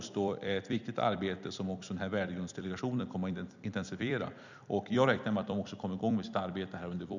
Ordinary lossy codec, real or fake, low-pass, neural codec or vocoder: none; fake; 7.2 kHz; vocoder, 44.1 kHz, 128 mel bands every 256 samples, BigVGAN v2